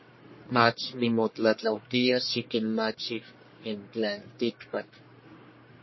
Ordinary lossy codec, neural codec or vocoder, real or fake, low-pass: MP3, 24 kbps; codec, 44.1 kHz, 1.7 kbps, Pupu-Codec; fake; 7.2 kHz